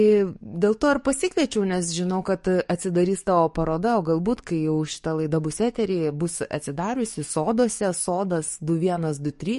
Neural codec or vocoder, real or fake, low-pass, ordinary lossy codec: codec, 44.1 kHz, 7.8 kbps, DAC; fake; 14.4 kHz; MP3, 48 kbps